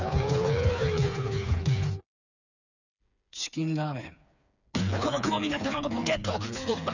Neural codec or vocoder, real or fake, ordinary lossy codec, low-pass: codec, 16 kHz, 4 kbps, FreqCodec, smaller model; fake; none; 7.2 kHz